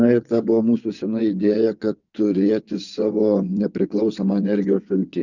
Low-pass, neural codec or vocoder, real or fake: 7.2 kHz; vocoder, 22.05 kHz, 80 mel bands, WaveNeXt; fake